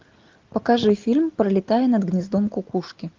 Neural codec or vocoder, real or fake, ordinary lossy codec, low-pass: none; real; Opus, 24 kbps; 7.2 kHz